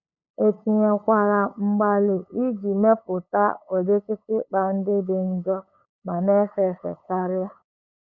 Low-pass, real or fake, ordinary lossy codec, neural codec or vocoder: 7.2 kHz; fake; none; codec, 16 kHz, 8 kbps, FunCodec, trained on LibriTTS, 25 frames a second